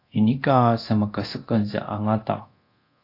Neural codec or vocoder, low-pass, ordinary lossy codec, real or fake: codec, 24 kHz, 0.9 kbps, DualCodec; 5.4 kHz; AAC, 32 kbps; fake